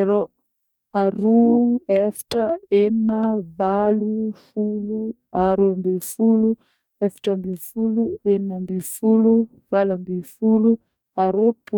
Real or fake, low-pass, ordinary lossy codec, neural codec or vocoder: fake; 19.8 kHz; none; codec, 44.1 kHz, 2.6 kbps, DAC